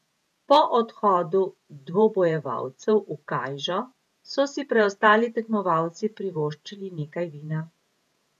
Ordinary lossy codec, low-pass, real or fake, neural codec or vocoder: none; 14.4 kHz; fake; vocoder, 48 kHz, 128 mel bands, Vocos